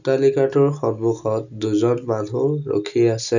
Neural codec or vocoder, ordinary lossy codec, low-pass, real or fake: none; none; 7.2 kHz; real